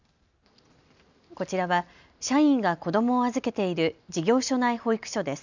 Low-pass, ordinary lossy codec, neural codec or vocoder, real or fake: 7.2 kHz; none; none; real